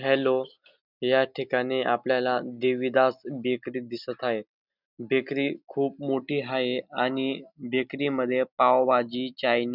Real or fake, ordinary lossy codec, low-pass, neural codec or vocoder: real; none; 5.4 kHz; none